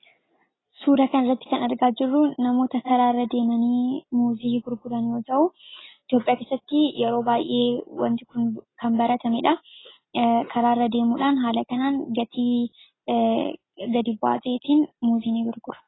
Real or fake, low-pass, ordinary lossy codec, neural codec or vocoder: real; 7.2 kHz; AAC, 16 kbps; none